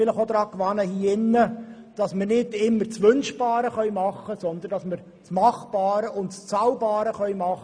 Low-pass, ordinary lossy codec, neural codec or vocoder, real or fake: 9.9 kHz; none; none; real